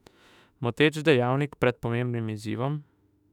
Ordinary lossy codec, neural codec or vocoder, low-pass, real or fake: none; autoencoder, 48 kHz, 32 numbers a frame, DAC-VAE, trained on Japanese speech; 19.8 kHz; fake